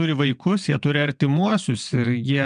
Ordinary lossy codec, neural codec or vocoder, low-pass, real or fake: MP3, 96 kbps; vocoder, 22.05 kHz, 80 mel bands, WaveNeXt; 9.9 kHz; fake